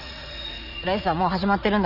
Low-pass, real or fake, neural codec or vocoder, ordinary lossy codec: 5.4 kHz; fake; vocoder, 44.1 kHz, 80 mel bands, Vocos; none